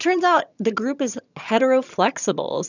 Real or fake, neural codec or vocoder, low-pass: fake; vocoder, 22.05 kHz, 80 mel bands, HiFi-GAN; 7.2 kHz